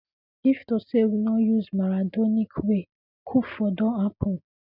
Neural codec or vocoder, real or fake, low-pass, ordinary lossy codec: none; real; 5.4 kHz; none